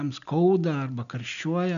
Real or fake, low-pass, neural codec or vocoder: real; 7.2 kHz; none